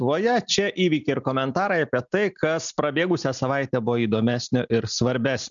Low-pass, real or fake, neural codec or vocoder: 7.2 kHz; real; none